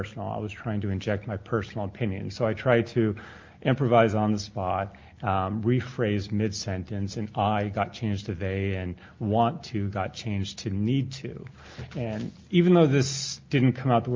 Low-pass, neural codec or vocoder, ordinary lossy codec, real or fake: 7.2 kHz; none; Opus, 24 kbps; real